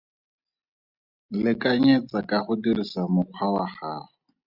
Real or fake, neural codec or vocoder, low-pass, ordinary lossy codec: real; none; 5.4 kHz; Opus, 64 kbps